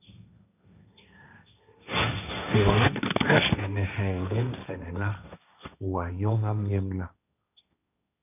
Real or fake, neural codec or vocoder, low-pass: fake; codec, 16 kHz, 1.1 kbps, Voila-Tokenizer; 3.6 kHz